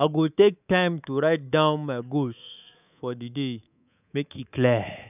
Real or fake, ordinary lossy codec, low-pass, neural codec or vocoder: fake; none; 3.6 kHz; codec, 24 kHz, 3.1 kbps, DualCodec